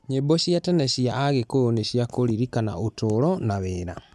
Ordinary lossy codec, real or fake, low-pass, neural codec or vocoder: none; real; none; none